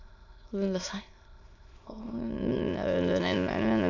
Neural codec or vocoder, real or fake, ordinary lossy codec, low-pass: autoencoder, 22.05 kHz, a latent of 192 numbers a frame, VITS, trained on many speakers; fake; none; 7.2 kHz